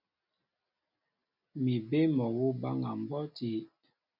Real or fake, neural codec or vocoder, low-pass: real; none; 5.4 kHz